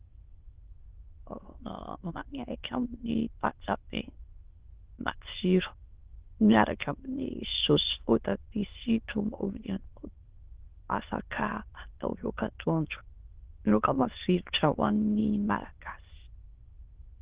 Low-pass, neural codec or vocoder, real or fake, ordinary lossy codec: 3.6 kHz; autoencoder, 22.05 kHz, a latent of 192 numbers a frame, VITS, trained on many speakers; fake; Opus, 16 kbps